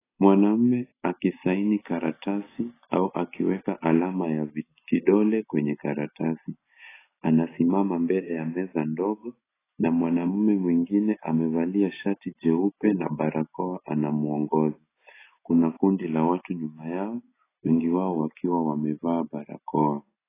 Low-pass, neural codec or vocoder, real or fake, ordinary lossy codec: 3.6 kHz; none; real; AAC, 16 kbps